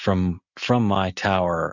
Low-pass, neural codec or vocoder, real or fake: 7.2 kHz; none; real